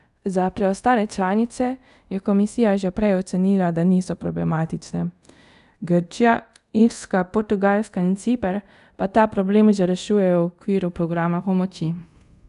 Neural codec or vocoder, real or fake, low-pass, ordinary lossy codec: codec, 24 kHz, 0.5 kbps, DualCodec; fake; 10.8 kHz; none